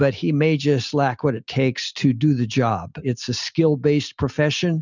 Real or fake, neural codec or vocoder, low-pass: real; none; 7.2 kHz